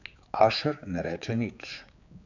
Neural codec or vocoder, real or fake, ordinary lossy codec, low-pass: codec, 16 kHz, 4 kbps, X-Codec, HuBERT features, trained on general audio; fake; none; 7.2 kHz